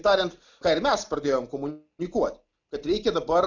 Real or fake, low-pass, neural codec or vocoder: real; 7.2 kHz; none